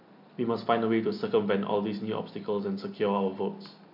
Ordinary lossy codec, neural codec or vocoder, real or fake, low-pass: MP3, 48 kbps; none; real; 5.4 kHz